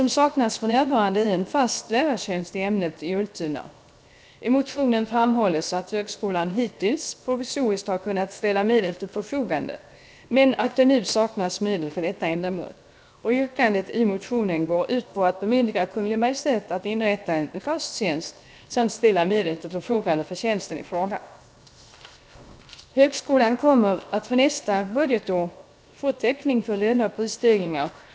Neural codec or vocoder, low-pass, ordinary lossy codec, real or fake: codec, 16 kHz, 0.7 kbps, FocalCodec; none; none; fake